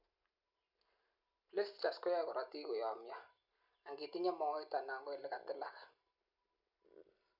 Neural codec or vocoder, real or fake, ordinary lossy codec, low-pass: none; real; none; 5.4 kHz